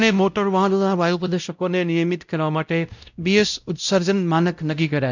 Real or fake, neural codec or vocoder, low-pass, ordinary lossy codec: fake; codec, 16 kHz, 0.5 kbps, X-Codec, WavLM features, trained on Multilingual LibriSpeech; 7.2 kHz; none